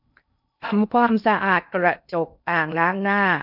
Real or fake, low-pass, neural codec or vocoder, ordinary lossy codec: fake; 5.4 kHz; codec, 16 kHz in and 24 kHz out, 0.6 kbps, FocalCodec, streaming, 2048 codes; none